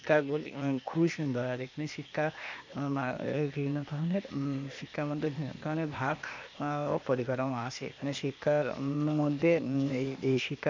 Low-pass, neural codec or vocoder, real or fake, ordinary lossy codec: 7.2 kHz; codec, 16 kHz, 0.8 kbps, ZipCodec; fake; MP3, 64 kbps